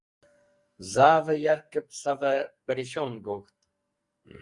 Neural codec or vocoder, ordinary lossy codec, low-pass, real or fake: codec, 44.1 kHz, 2.6 kbps, SNAC; Opus, 64 kbps; 10.8 kHz; fake